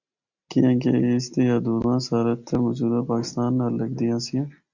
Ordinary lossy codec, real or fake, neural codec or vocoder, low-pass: Opus, 64 kbps; real; none; 7.2 kHz